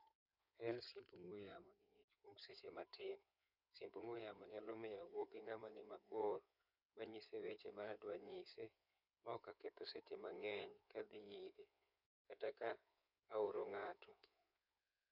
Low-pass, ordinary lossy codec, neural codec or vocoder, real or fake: 5.4 kHz; none; codec, 16 kHz in and 24 kHz out, 2.2 kbps, FireRedTTS-2 codec; fake